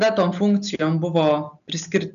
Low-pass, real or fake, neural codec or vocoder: 7.2 kHz; real; none